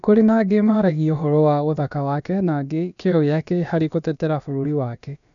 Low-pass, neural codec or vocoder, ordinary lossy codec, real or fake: 7.2 kHz; codec, 16 kHz, about 1 kbps, DyCAST, with the encoder's durations; none; fake